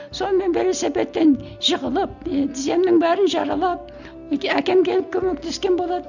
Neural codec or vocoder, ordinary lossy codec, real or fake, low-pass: none; none; real; 7.2 kHz